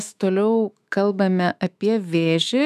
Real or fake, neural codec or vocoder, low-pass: fake; autoencoder, 48 kHz, 128 numbers a frame, DAC-VAE, trained on Japanese speech; 14.4 kHz